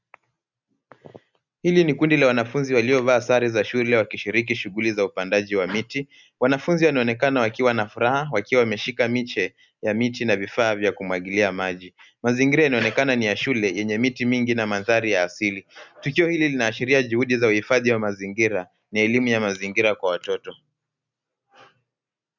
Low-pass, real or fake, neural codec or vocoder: 7.2 kHz; real; none